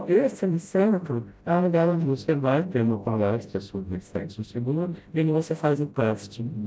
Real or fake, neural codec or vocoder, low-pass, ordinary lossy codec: fake; codec, 16 kHz, 0.5 kbps, FreqCodec, smaller model; none; none